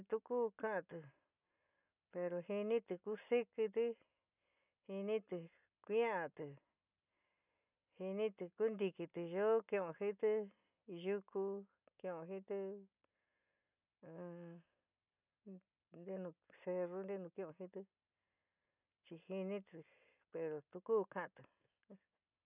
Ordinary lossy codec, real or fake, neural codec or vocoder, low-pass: none; real; none; 3.6 kHz